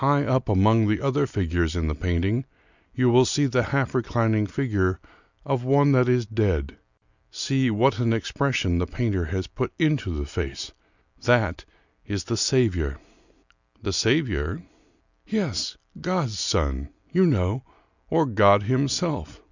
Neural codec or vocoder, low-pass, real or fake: none; 7.2 kHz; real